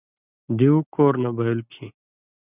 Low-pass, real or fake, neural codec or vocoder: 3.6 kHz; real; none